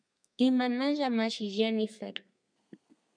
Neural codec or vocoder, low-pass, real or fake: codec, 32 kHz, 1.9 kbps, SNAC; 9.9 kHz; fake